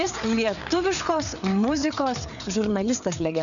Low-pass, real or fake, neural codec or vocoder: 7.2 kHz; fake; codec, 16 kHz, 4 kbps, FreqCodec, larger model